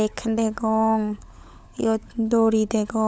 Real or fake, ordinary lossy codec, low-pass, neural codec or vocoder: fake; none; none; codec, 16 kHz, 16 kbps, FunCodec, trained on LibriTTS, 50 frames a second